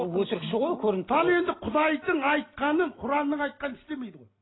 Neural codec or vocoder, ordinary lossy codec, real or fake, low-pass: none; AAC, 16 kbps; real; 7.2 kHz